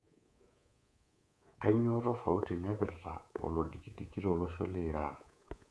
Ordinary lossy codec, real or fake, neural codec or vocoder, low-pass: none; fake; codec, 24 kHz, 3.1 kbps, DualCodec; none